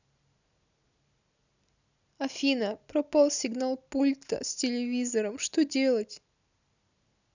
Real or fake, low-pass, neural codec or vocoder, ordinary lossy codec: real; 7.2 kHz; none; none